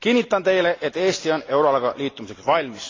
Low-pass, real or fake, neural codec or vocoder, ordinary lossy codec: 7.2 kHz; real; none; AAC, 32 kbps